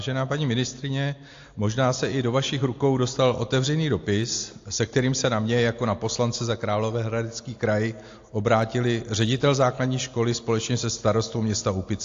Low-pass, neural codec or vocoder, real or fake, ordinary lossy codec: 7.2 kHz; none; real; AAC, 48 kbps